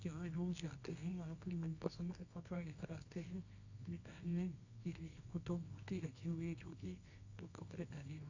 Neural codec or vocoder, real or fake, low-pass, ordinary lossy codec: codec, 24 kHz, 0.9 kbps, WavTokenizer, medium music audio release; fake; 7.2 kHz; AAC, 48 kbps